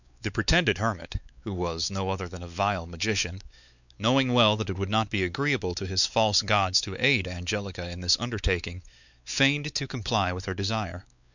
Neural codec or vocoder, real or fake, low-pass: codec, 16 kHz, 4 kbps, X-Codec, WavLM features, trained on Multilingual LibriSpeech; fake; 7.2 kHz